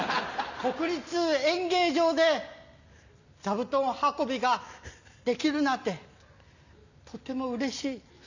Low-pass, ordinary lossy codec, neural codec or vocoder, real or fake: 7.2 kHz; none; none; real